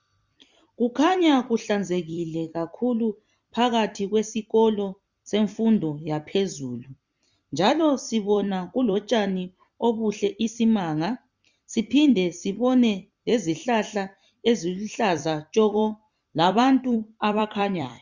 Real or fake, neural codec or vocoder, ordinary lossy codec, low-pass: fake; vocoder, 44.1 kHz, 128 mel bands every 256 samples, BigVGAN v2; Opus, 64 kbps; 7.2 kHz